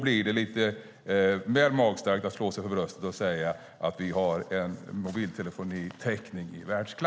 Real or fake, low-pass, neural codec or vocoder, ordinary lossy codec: real; none; none; none